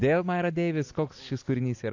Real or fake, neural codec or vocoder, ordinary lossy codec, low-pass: real; none; AAC, 48 kbps; 7.2 kHz